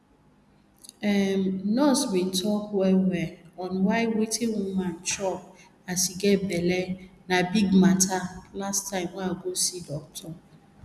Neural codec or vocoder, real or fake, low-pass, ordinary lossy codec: none; real; none; none